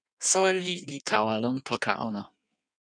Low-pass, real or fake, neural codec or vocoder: 9.9 kHz; fake; codec, 16 kHz in and 24 kHz out, 1.1 kbps, FireRedTTS-2 codec